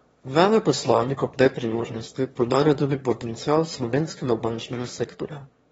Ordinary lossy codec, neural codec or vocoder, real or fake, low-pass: AAC, 24 kbps; autoencoder, 22.05 kHz, a latent of 192 numbers a frame, VITS, trained on one speaker; fake; 9.9 kHz